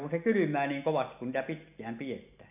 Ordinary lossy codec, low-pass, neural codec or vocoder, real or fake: none; 3.6 kHz; none; real